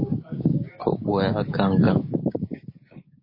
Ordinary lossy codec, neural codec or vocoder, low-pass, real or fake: MP3, 24 kbps; codec, 16 kHz, 6 kbps, DAC; 5.4 kHz; fake